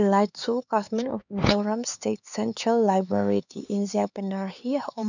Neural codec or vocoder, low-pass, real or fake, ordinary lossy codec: codec, 16 kHz, 4 kbps, X-Codec, HuBERT features, trained on LibriSpeech; 7.2 kHz; fake; none